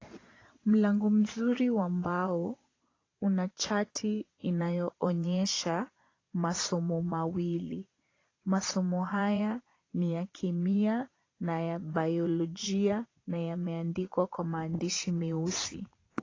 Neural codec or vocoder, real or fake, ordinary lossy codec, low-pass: vocoder, 22.05 kHz, 80 mel bands, Vocos; fake; AAC, 32 kbps; 7.2 kHz